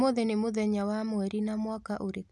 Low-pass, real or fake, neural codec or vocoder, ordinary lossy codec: none; real; none; none